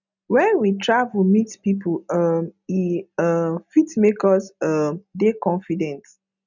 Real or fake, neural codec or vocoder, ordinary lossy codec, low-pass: real; none; none; 7.2 kHz